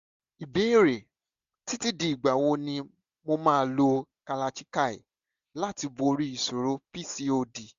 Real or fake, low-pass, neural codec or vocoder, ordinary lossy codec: real; 7.2 kHz; none; Opus, 64 kbps